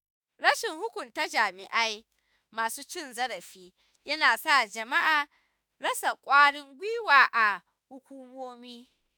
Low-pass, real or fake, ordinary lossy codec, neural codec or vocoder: none; fake; none; autoencoder, 48 kHz, 32 numbers a frame, DAC-VAE, trained on Japanese speech